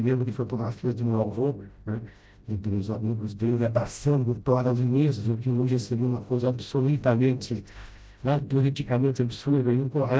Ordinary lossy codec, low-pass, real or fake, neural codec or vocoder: none; none; fake; codec, 16 kHz, 0.5 kbps, FreqCodec, smaller model